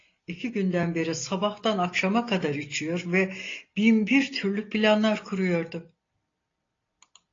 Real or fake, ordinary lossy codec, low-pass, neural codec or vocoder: real; AAC, 32 kbps; 7.2 kHz; none